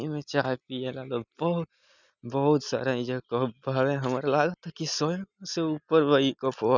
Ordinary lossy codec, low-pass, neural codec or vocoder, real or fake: none; 7.2 kHz; none; real